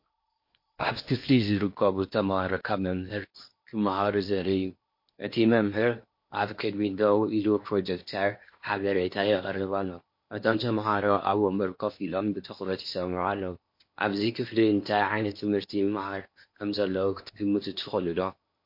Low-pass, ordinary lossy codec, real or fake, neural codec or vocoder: 5.4 kHz; MP3, 32 kbps; fake; codec, 16 kHz in and 24 kHz out, 0.8 kbps, FocalCodec, streaming, 65536 codes